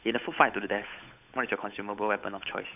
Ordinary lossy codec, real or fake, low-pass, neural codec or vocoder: none; fake; 3.6 kHz; codec, 16 kHz, 8 kbps, FunCodec, trained on Chinese and English, 25 frames a second